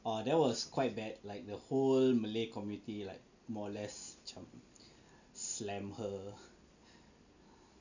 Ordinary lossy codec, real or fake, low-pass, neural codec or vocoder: none; real; 7.2 kHz; none